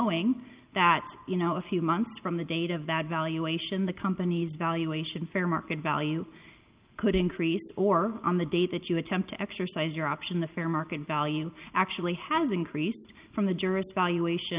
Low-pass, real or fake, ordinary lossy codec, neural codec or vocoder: 3.6 kHz; real; Opus, 24 kbps; none